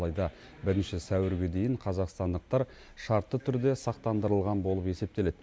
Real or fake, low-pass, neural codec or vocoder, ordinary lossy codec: real; none; none; none